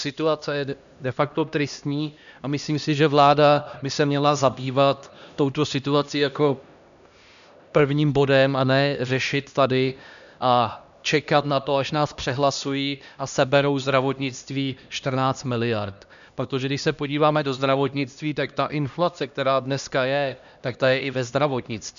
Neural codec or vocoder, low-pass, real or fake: codec, 16 kHz, 1 kbps, X-Codec, HuBERT features, trained on LibriSpeech; 7.2 kHz; fake